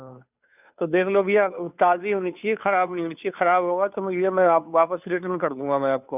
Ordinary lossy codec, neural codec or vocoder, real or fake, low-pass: none; codec, 16 kHz, 2 kbps, FunCodec, trained on Chinese and English, 25 frames a second; fake; 3.6 kHz